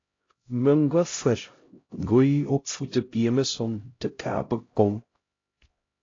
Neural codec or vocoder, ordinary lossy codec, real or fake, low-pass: codec, 16 kHz, 0.5 kbps, X-Codec, HuBERT features, trained on LibriSpeech; AAC, 32 kbps; fake; 7.2 kHz